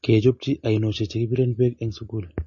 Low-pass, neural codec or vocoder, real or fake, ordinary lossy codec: 7.2 kHz; none; real; MP3, 32 kbps